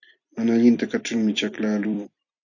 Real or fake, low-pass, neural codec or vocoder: fake; 7.2 kHz; vocoder, 44.1 kHz, 128 mel bands every 256 samples, BigVGAN v2